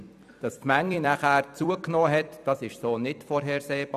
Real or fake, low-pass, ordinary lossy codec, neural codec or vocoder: fake; 14.4 kHz; none; vocoder, 44.1 kHz, 128 mel bands every 256 samples, BigVGAN v2